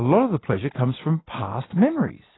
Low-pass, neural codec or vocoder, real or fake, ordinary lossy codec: 7.2 kHz; none; real; AAC, 16 kbps